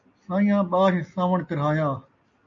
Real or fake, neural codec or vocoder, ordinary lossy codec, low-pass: real; none; AAC, 64 kbps; 7.2 kHz